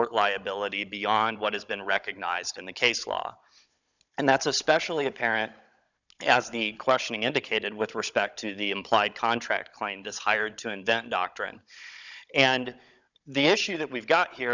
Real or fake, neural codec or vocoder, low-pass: fake; codec, 16 kHz, 16 kbps, FunCodec, trained on Chinese and English, 50 frames a second; 7.2 kHz